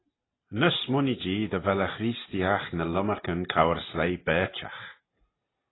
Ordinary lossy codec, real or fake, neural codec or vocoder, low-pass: AAC, 16 kbps; real; none; 7.2 kHz